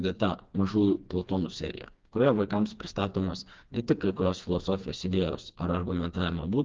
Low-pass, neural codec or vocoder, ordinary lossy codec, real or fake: 7.2 kHz; codec, 16 kHz, 2 kbps, FreqCodec, smaller model; Opus, 32 kbps; fake